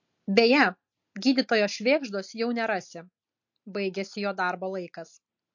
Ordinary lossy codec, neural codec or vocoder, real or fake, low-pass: MP3, 48 kbps; none; real; 7.2 kHz